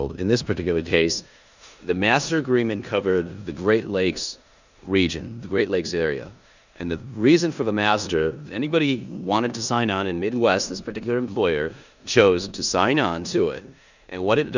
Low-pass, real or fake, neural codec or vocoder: 7.2 kHz; fake; codec, 16 kHz in and 24 kHz out, 0.9 kbps, LongCat-Audio-Codec, four codebook decoder